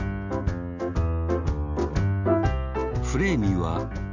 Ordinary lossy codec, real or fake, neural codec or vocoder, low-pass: none; real; none; 7.2 kHz